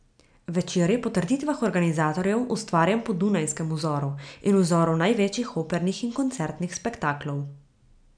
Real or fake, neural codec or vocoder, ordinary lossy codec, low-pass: real; none; none; 9.9 kHz